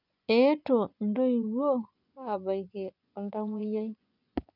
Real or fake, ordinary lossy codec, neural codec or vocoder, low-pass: fake; none; vocoder, 24 kHz, 100 mel bands, Vocos; 5.4 kHz